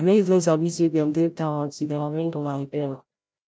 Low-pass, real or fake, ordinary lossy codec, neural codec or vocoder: none; fake; none; codec, 16 kHz, 0.5 kbps, FreqCodec, larger model